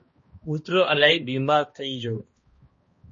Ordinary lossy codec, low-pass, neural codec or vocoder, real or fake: MP3, 32 kbps; 7.2 kHz; codec, 16 kHz, 1 kbps, X-Codec, HuBERT features, trained on balanced general audio; fake